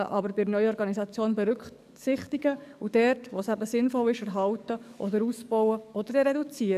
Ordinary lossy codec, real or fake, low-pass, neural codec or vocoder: none; fake; 14.4 kHz; codec, 44.1 kHz, 7.8 kbps, DAC